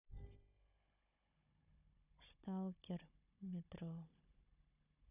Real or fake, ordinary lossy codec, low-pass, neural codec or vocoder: fake; none; 3.6 kHz; codec, 16 kHz, 16 kbps, FunCodec, trained on Chinese and English, 50 frames a second